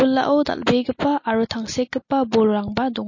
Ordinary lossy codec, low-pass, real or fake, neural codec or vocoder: MP3, 32 kbps; 7.2 kHz; real; none